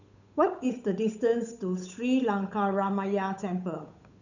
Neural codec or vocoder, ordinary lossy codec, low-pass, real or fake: codec, 16 kHz, 8 kbps, FunCodec, trained on Chinese and English, 25 frames a second; none; 7.2 kHz; fake